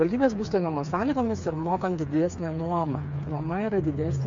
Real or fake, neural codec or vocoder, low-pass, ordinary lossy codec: fake; codec, 16 kHz, 4 kbps, FreqCodec, smaller model; 7.2 kHz; MP3, 48 kbps